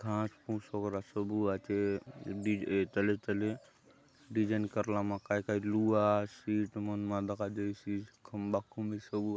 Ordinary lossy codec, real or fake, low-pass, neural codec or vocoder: none; real; none; none